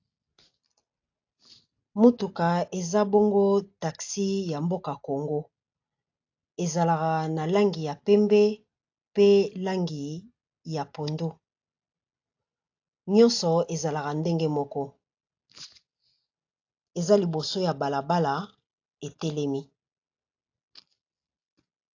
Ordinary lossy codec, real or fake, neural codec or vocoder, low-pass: AAC, 48 kbps; real; none; 7.2 kHz